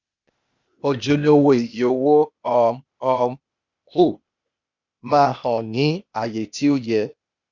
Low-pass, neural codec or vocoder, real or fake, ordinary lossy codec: 7.2 kHz; codec, 16 kHz, 0.8 kbps, ZipCodec; fake; Opus, 64 kbps